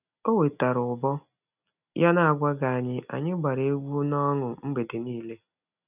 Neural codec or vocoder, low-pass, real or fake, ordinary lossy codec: none; 3.6 kHz; real; none